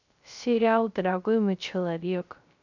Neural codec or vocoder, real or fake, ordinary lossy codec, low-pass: codec, 16 kHz, 0.3 kbps, FocalCodec; fake; none; 7.2 kHz